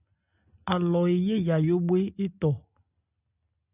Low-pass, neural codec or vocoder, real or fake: 3.6 kHz; none; real